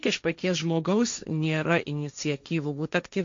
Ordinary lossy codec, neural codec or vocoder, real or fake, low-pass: MP3, 64 kbps; codec, 16 kHz, 1.1 kbps, Voila-Tokenizer; fake; 7.2 kHz